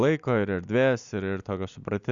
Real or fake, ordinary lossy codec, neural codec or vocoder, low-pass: real; Opus, 64 kbps; none; 7.2 kHz